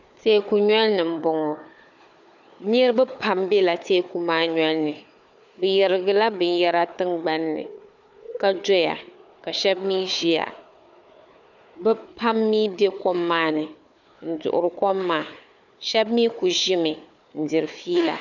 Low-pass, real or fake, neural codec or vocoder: 7.2 kHz; fake; codec, 16 kHz, 4 kbps, FunCodec, trained on Chinese and English, 50 frames a second